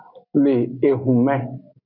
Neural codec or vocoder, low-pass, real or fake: none; 5.4 kHz; real